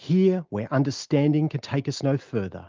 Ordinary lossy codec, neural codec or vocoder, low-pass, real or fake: Opus, 32 kbps; none; 7.2 kHz; real